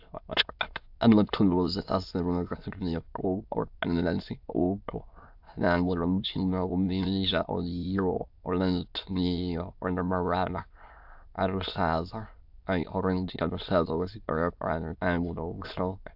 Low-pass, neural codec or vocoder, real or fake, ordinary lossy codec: 5.4 kHz; autoencoder, 22.05 kHz, a latent of 192 numbers a frame, VITS, trained on many speakers; fake; AAC, 48 kbps